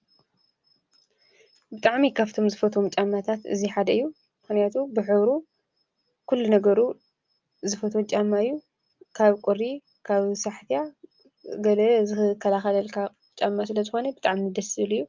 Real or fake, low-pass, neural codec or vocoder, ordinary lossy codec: real; 7.2 kHz; none; Opus, 24 kbps